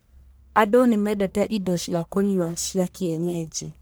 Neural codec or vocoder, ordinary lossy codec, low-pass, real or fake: codec, 44.1 kHz, 1.7 kbps, Pupu-Codec; none; none; fake